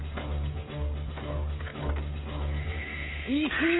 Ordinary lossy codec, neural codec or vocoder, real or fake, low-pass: AAC, 16 kbps; codec, 16 kHz, 16 kbps, FreqCodec, smaller model; fake; 7.2 kHz